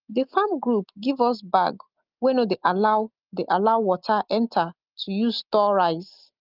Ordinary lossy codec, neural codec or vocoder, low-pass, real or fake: Opus, 32 kbps; none; 5.4 kHz; real